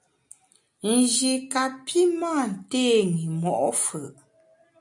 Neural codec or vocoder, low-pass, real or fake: none; 10.8 kHz; real